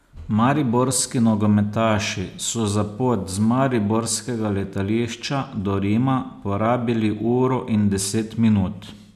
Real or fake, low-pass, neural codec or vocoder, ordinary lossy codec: real; 14.4 kHz; none; none